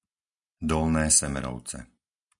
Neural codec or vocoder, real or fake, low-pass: none; real; 10.8 kHz